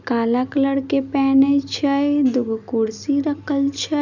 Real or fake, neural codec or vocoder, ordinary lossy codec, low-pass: real; none; none; 7.2 kHz